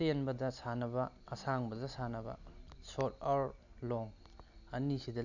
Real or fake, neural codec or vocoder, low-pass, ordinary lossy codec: real; none; 7.2 kHz; none